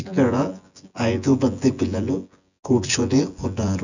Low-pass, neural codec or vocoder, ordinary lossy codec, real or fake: 7.2 kHz; vocoder, 24 kHz, 100 mel bands, Vocos; none; fake